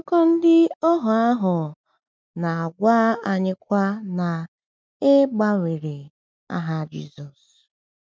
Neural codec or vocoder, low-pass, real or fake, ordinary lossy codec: none; none; real; none